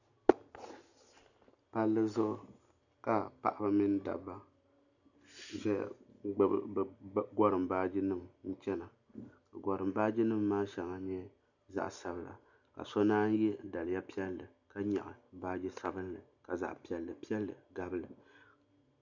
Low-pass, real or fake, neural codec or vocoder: 7.2 kHz; real; none